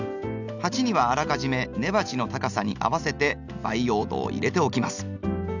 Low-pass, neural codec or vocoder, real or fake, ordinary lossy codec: 7.2 kHz; none; real; none